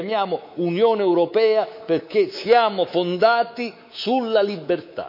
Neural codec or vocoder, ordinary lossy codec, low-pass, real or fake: codec, 24 kHz, 3.1 kbps, DualCodec; none; 5.4 kHz; fake